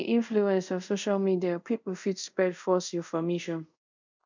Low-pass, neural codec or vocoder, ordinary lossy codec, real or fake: 7.2 kHz; codec, 24 kHz, 0.5 kbps, DualCodec; MP3, 64 kbps; fake